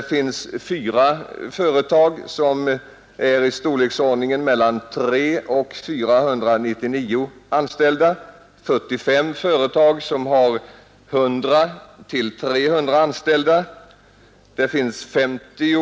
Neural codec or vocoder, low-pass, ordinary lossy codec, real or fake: none; none; none; real